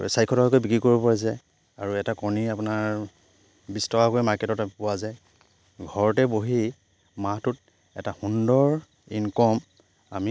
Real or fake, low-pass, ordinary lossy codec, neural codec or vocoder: real; none; none; none